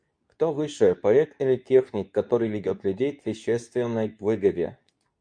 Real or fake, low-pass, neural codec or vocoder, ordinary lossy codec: fake; 9.9 kHz; codec, 24 kHz, 0.9 kbps, WavTokenizer, medium speech release version 2; MP3, 96 kbps